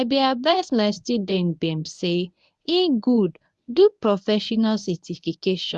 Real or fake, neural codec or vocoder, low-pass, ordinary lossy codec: fake; codec, 24 kHz, 0.9 kbps, WavTokenizer, medium speech release version 1; none; none